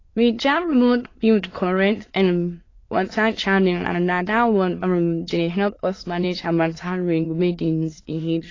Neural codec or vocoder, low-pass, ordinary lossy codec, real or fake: autoencoder, 22.05 kHz, a latent of 192 numbers a frame, VITS, trained on many speakers; 7.2 kHz; AAC, 32 kbps; fake